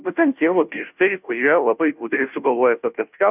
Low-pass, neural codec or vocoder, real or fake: 3.6 kHz; codec, 16 kHz, 0.5 kbps, FunCodec, trained on Chinese and English, 25 frames a second; fake